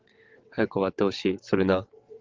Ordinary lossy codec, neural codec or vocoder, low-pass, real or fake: Opus, 16 kbps; vocoder, 22.05 kHz, 80 mel bands, WaveNeXt; 7.2 kHz; fake